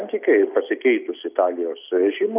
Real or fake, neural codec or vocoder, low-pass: real; none; 3.6 kHz